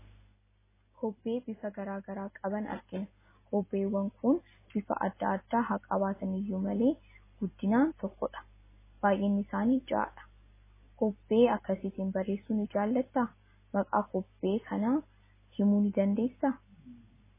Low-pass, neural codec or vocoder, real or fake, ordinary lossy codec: 3.6 kHz; none; real; MP3, 16 kbps